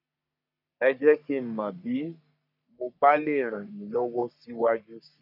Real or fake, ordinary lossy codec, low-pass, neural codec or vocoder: fake; none; 5.4 kHz; codec, 44.1 kHz, 3.4 kbps, Pupu-Codec